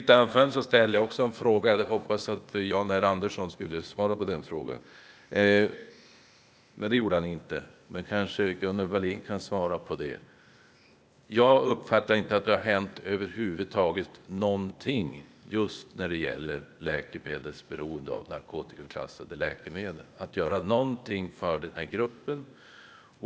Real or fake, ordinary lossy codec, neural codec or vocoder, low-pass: fake; none; codec, 16 kHz, 0.8 kbps, ZipCodec; none